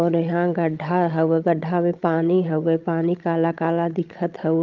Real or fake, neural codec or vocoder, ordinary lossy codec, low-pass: fake; codec, 16 kHz, 16 kbps, FreqCodec, larger model; Opus, 32 kbps; 7.2 kHz